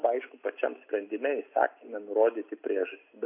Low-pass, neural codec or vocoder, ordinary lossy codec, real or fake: 3.6 kHz; none; AAC, 32 kbps; real